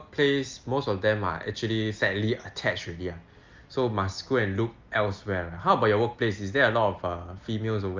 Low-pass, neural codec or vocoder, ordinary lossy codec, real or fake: 7.2 kHz; none; Opus, 24 kbps; real